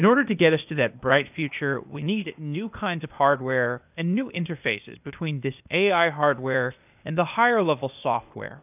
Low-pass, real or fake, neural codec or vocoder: 3.6 kHz; fake; codec, 16 kHz, 0.8 kbps, ZipCodec